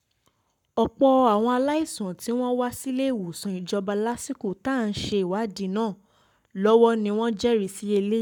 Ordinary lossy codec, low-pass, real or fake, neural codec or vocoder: none; 19.8 kHz; fake; codec, 44.1 kHz, 7.8 kbps, Pupu-Codec